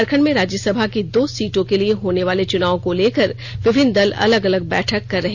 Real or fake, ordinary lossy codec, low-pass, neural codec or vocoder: real; Opus, 64 kbps; 7.2 kHz; none